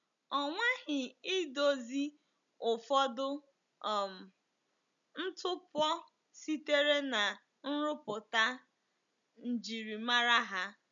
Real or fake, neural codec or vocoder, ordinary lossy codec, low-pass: real; none; none; 7.2 kHz